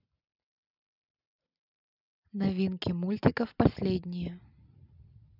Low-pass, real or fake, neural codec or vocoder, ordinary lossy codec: 5.4 kHz; real; none; none